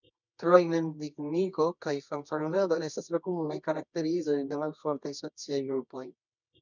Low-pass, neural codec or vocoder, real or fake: 7.2 kHz; codec, 24 kHz, 0.9 kbps, WavTokenizer, medium music audio release; fake